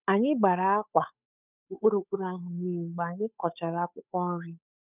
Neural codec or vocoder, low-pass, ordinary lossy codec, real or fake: codec, 16 kHz, 8 kbps, FunCodec, trained on Chinese and English, 25 frames a second; 3.6 kHz; none; fake